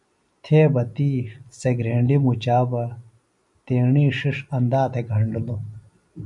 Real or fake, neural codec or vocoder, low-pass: real; none; 10.8 kHz